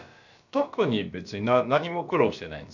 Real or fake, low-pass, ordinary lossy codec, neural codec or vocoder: fake; 7.2 kHz; none; codec, 16 kHz, about 1 kbps, DyCAST, with the encoder's durations